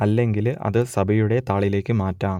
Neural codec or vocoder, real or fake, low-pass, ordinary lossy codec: none; real; 19.8 kHz; MP3, 96 kbps